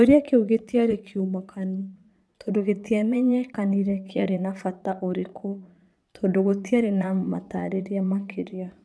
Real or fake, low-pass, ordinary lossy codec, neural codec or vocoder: fake; none; none; vocoder, 22.05 kHz, 80 mel bands, Vocos